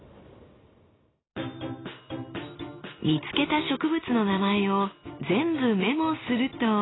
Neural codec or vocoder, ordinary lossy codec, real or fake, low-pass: none; AAC, 16 kbps; real; 7.2 kHz